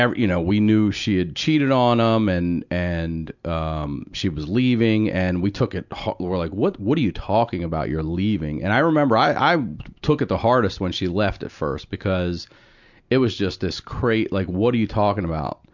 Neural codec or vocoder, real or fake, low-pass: none; real; 7.2 kHz